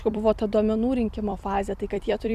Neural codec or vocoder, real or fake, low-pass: vocoder, 44.1 kHz, 128 mel bands every 256 samples, BigVGAN v2; fake; 14.4 kHz